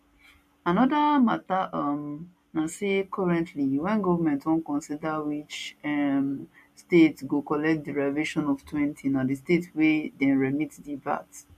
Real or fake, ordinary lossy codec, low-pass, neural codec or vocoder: real; MP3, 64 kbps; 14.4 kHz; none